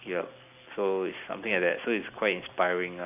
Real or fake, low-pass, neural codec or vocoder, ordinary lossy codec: real; 3.6 kHz; none; AAC, 32 kbps